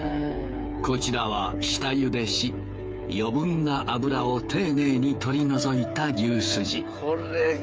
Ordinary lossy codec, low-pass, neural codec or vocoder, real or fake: none; none; codec, 16 kHz, 8 kbps, FreqCodec, smaller model; fake